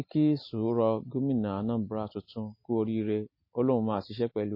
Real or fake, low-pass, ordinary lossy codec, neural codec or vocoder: real; 5.4 kHz; MP3, 24 kbps; none